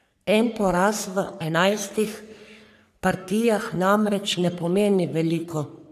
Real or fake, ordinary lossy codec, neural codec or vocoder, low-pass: fake; none; codec, 44.1 kHz, 3.4 kbps, Pupu-Codec; 14.4 kHz